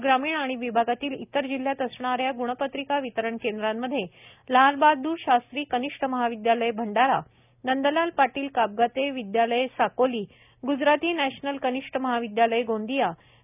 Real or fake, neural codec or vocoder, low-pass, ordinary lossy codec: real; none; 3.6 kHz; none